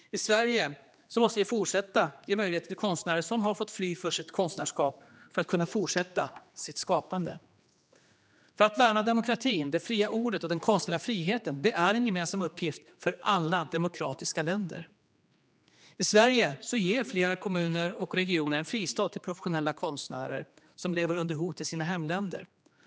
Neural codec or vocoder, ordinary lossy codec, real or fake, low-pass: codec, 16 kHz, 2 kbps, X-Codec, HuBERT features, trained on general audio; none; fake; none